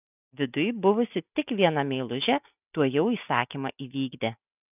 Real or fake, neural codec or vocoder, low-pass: real; none; 3.6 kHz